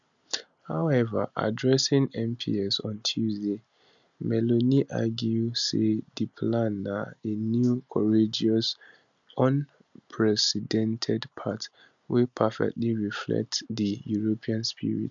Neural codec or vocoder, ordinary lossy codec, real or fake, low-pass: none; MP3, 96 kbps; real; 7.2 kHz